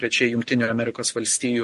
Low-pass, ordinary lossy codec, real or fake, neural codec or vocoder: 14.4 kHz; MP3, 48 kbps; real; none